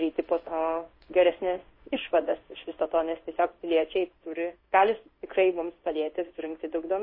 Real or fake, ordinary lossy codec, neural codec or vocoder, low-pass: fake; MP3, 24 kbps; codec, 16 kHz in and 24 kHz out, 1 kbps, XY-Tokenizer; 5.4 kHz